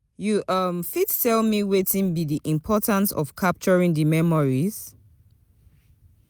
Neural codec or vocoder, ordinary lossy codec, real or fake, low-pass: none; none; real; none